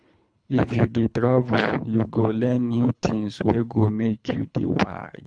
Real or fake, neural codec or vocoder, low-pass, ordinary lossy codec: fake; codec, 24 kHz, 3 kbps, HILCodec; 9.9 kHz; none